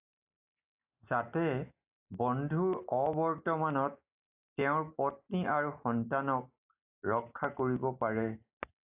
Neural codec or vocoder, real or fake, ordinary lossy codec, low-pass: none; real; AAC, 32 kbps; 3.6 kHz